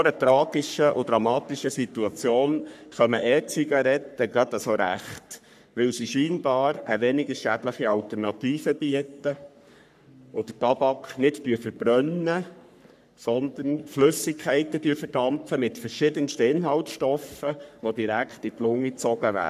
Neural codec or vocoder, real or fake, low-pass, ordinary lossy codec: codec, 44.1 kHz, 3.4 kbps, Pupu-Codec; fake; 14.4 kHz; none